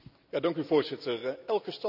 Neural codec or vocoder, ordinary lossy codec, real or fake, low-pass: none; none; real; 5.4 kHz